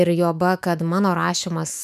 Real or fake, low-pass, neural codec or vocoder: fake; 14.4 kHz; autoencoder, 48 kHz, 128 numbers a frame, DAC-VAE, trained on Japanese speech